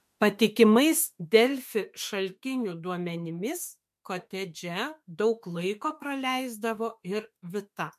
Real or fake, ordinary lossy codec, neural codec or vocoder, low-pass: fake; MP3, 64 kbps; autoencoder, 48 kHz, 32 numbers a frame, DAC-VAE, trained on Japanese speech; 14.4 kHz